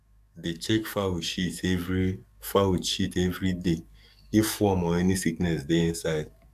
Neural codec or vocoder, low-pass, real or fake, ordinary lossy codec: codec, 44.1 kHz, 7.8 kbps, DAC; 14.4 kHz; fake; AAC, 96 kbps